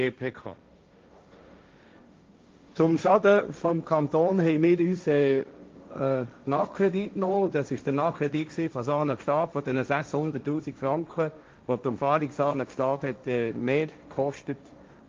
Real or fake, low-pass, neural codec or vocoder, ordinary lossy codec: fake; 7.2 kHz; codec, 16 kHz, 1.1 kbps, Voila-Tokenizer; Opus, 24 kbps